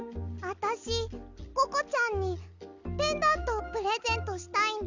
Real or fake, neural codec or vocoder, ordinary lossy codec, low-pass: real; none; none; 7.2 kHz